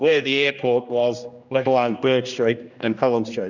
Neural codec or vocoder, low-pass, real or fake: codec, 16 kHz, 1 kbps, X-Codec, HuBERT features, trained on general audio; 7.2 kHz; fake